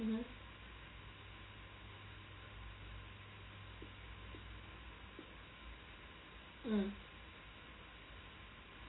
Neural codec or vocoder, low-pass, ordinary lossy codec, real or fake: none; 7.2 kHz; AAC, 16 kbps; real